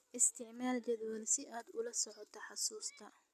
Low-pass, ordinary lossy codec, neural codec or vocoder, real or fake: 14.4 kHz; none; none; real